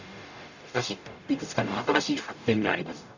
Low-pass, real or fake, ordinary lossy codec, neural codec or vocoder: 7.2 kHz; fake; none; codec, 44.1 kHz, 0.9 kbps, DAC